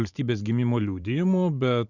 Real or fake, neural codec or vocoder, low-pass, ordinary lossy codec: fake; vocoder, 44.1 kHz, 128 mel bands every 512 samples, BigVGAN v2; 7.2 kHz; Opus, 64 kbps